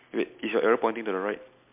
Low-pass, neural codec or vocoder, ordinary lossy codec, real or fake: 3.6 kHz; none; MP3, 32 kbps; real